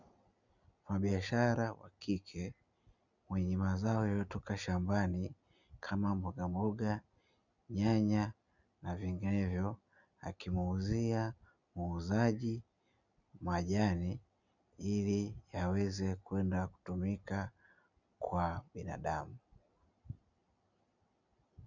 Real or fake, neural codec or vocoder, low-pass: real; none; 7.2 kHz